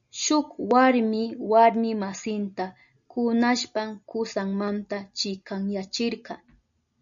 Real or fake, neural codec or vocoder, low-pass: real; none; 7.2 kHz